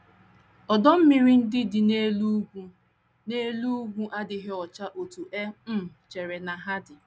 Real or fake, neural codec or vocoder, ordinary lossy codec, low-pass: real; none; none; none